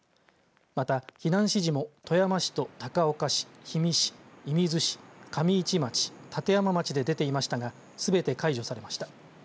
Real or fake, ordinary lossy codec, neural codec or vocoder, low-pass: real; none; none; none